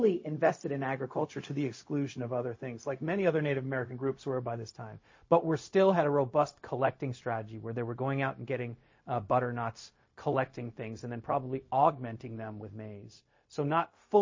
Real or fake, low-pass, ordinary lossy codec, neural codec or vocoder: fake; 7.2 kHz; MP3, 32 kbps; codec, 16 kHz, 0.4 kbps, LongCat-Audio-Codec